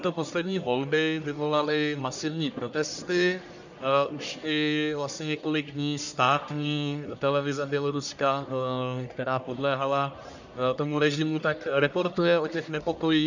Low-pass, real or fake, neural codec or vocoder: 7.2 kHz; fake; codec, 44.1 kHz, 1.7 kbps, Pupu-Codec